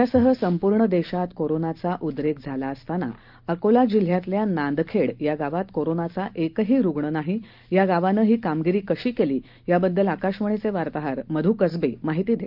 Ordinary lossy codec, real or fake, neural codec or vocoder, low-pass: Opus, 32 kbps; real; none; 5.4 kHz